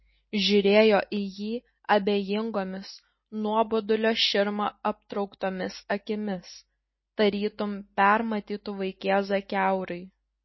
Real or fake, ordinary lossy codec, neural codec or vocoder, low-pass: real; MP3, 24 kbps; none; 7.2 kHz